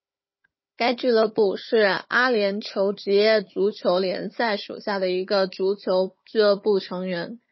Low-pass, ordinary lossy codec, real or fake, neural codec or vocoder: 7.2 kHz; MP3, 24 kbps; fake; codec, 16 kHz, 4 kbps, FunCodec, trained on Chinese and English, 50 frames a second